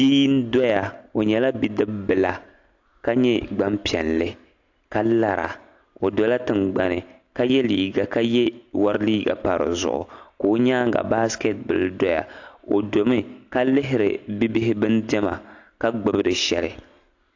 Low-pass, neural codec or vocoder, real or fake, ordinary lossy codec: 7.2 kHz; none; real; AAC, 48 kbps